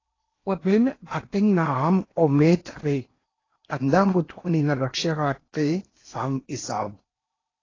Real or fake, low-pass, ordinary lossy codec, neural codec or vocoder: fake; 7.2 kHz; AAC, 32 kbps; codec, 16 kHz in and 24 kHz out, 0.8 kbps, FocalCodec, streaming, 65536 codes